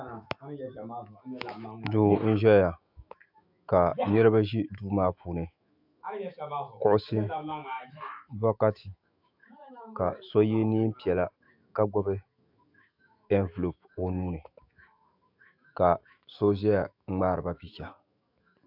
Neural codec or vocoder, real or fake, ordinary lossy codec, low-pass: autoencoder, 48 kHz, 128 numbers a frame, DAC-VAE, trained on Japanese speech; fake; AAC, 48 kbps; 5.4 kHz